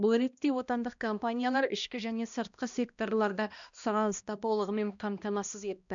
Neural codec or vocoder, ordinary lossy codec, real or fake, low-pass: codec, 16 kHz, 1 kbps, X-Codec, HuBERT features, trained on balanced general audio; MP3, 96 kbps; fake; 7.2 kHz